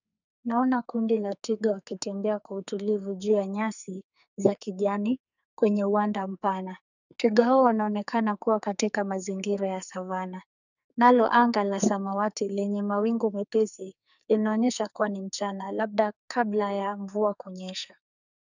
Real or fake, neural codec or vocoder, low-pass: fake; codec, 44.1 kHz, 2.6 kbps, SNAC; 7.2 kHz